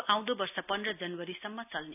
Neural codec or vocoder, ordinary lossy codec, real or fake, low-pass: none; none; real; 3.6 kHz